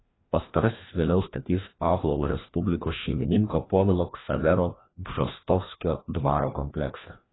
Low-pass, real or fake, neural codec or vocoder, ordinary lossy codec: 7.2 kHz; fake; codec, 16 kHz, 1 kbps, FreqCodec, larger model; AAC, 16 kbps